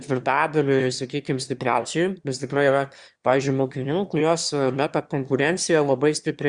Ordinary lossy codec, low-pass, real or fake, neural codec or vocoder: Opus, 64 kbps; 9.9 kHz; fake; autoencoder, 22.05 kHz, a latent of 192 numbers a frame, VITS, trained on one speaker